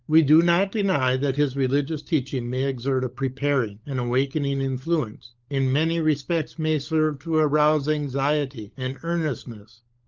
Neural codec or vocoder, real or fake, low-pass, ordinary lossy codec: codec, 16 kHz, 16 kbps, FunCodec, trained on LibriTTS, 50 frames a second; fake; 7.2 kHz; Opus, 32 kbps